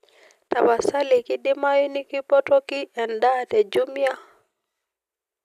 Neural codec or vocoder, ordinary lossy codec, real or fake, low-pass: none; none; real; 14.4 kHz